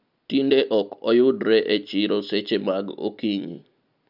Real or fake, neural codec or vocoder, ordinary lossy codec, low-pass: real; none; none; 5.4 kHz